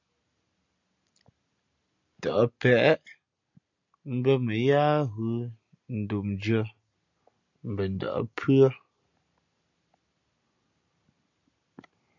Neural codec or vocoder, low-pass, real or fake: none; 7.2 kHz; real